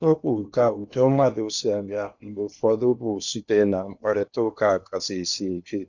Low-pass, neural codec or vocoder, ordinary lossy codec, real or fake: 7.2 kHz; codec, 16 kHz in and 24 kHz out, 0.8 kbps, FocalCodec, streaming, 65536 codes; none; fake